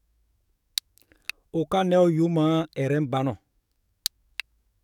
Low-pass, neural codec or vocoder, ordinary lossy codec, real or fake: 19.8 kHz; codec, 44.1 kHz, 7.8 kbps, DAC; none; fake